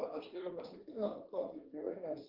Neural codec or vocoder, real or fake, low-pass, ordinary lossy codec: codec, 24 kHz, 1 kbps, SNAC; fake; 5.4 kHz; Opus, 16 kbps